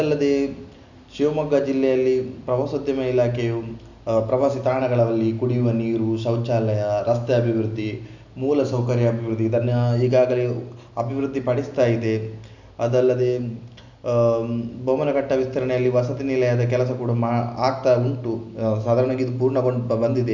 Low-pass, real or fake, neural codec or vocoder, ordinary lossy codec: 7.2 kHz; real; none; none